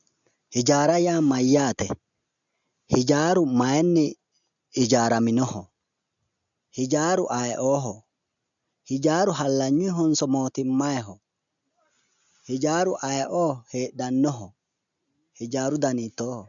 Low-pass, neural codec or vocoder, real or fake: 7.2 kHz; none; real